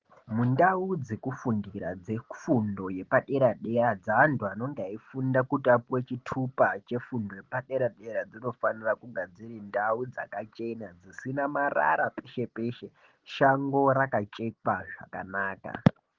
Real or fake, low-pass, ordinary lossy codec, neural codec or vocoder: real; 7.2 kHz; Opus, 16 kbps; none